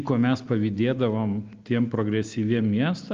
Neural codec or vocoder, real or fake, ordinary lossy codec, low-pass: none; real; Opus, 32 kbps; 7.2 kHz